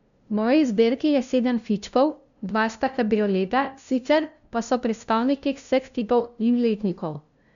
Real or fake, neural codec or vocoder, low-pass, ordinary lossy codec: fake; codec, 16 kHz, 0.5 kbps, FunCodec, trained on LibriTTS, 25 frames a second; 7.2 kHz; none